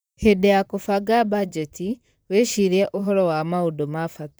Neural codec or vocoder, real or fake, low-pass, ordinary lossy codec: vocoder, 44.1 kHz, 128 mel bands, Pupu-Vocoder; fake; none; none